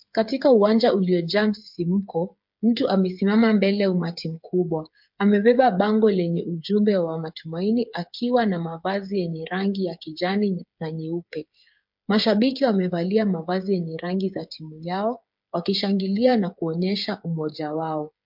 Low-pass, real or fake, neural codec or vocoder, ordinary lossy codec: 5.4 kHz; fake; codec, 16 kHz, 8 kbps, FreqCodec, smaller model; MP3, 48 kbps